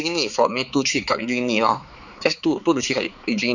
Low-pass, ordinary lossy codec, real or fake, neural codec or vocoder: 7.2 kHz; none; fake; codec, 16 kHz, 4 kbps, X-Codec, HuBERT features, trained on balanced general audio